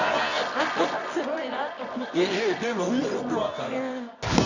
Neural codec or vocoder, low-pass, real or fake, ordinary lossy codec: codec, 24 kHz, 0.9 kbps, WavTokenizer, medium music audio release; 7.2 kHz; fake; Opus, 64 kbps